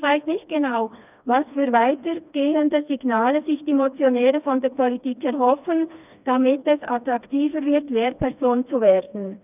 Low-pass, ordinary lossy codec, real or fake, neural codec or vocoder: 3.6 kHz; none; fake; codec, 16 kHz, 2 kbps, FreqCodec, smaller model